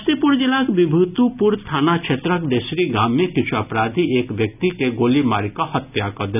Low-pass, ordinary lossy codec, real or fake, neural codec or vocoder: 3.6 kHz; none; real; none